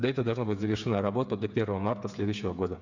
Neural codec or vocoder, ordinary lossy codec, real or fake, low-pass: codec, 16 kHz, 8 kbps, FreqCodec, smaller model; none; fake; 7.2 kHz